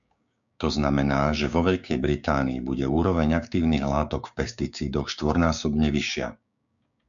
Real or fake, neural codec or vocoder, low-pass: fake; codec, 16 kHz, 6 kbps, DAC; 7.2 kHz